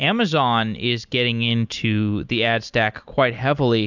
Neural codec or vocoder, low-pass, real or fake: none; 7.2 kHz; real